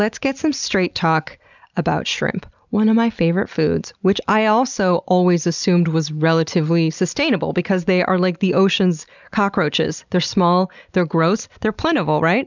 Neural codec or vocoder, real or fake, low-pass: none; real; 7.2 kHz